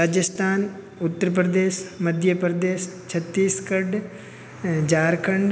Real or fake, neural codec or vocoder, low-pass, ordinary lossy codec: real; none; none; none